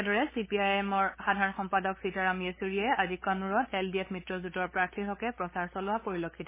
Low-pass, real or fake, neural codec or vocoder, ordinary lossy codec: 3.6 kHz; fake; codec, 16 kHz, 8 kbps, FunCodec, trained on LibriTTS, 25 frames a second; MP3, 16 kbps